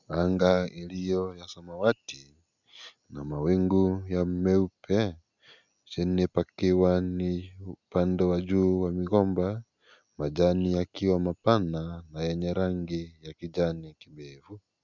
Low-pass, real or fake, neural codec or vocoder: 7.2 kHz; real; none